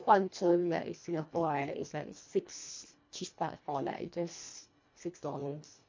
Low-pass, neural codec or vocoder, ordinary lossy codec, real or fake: 7.2 kHz; codec, 24 kHz, 1.5 kbps, HILCodec; MP3, 48 kbps; fake